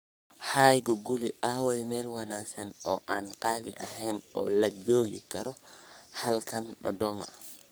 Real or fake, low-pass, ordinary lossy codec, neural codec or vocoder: fake; none; none; codec, 44.1 kHz, 3.4 kbps, Pupu-Codec